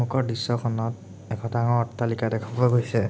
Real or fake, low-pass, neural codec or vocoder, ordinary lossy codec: real; none; none; none